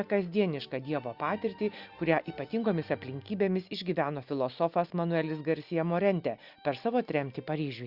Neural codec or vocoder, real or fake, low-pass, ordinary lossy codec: none; real; 5.4 kHz; Opus, 64 kbps